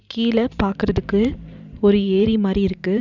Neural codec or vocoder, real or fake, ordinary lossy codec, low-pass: vocoder, 44.1 kHz, 128 mel bands every 512 samples, BigVGAN v2; fake; none; 7.2 kHz